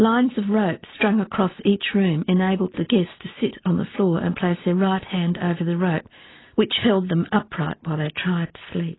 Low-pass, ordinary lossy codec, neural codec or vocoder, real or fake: 7.2 kHz; AAC, 16 kbps; none; real